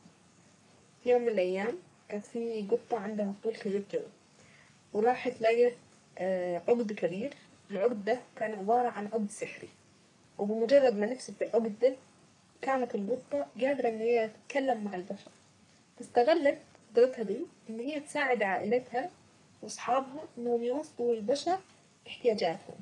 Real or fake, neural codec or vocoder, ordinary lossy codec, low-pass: fake; codec, 44.1 kHz, 3.4 kbps, Pupu-Codec; none; 10.8 kHz